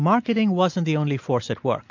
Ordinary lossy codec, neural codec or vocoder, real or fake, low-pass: MP3, 48 kbps; none; real; 7.2 kHz